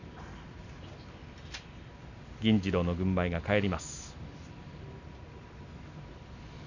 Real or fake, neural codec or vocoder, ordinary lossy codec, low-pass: real; none; none; 7.2 kHz